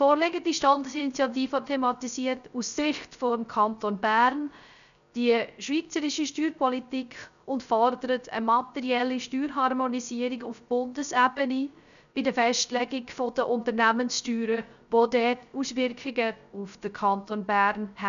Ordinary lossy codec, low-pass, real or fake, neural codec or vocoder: none; 7.2 kHz; fake; codec, 16 kHz, 0.3 kbps, FocalCodec